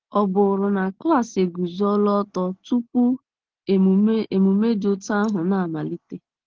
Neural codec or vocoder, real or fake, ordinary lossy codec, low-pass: none; real; Opus, 16 kbps; 7.2 kHz